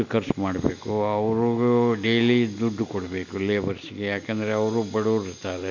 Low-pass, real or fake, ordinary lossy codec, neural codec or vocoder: 7.2 kHz; real; none; none